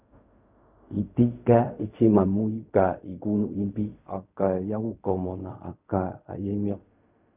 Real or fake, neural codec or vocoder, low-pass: fake; codec, 16 kHz in and 24 kHz out, 0.4 kbps, LongCat-Audio-Codec, fine tuned four codebook decoder; 3.6 kHz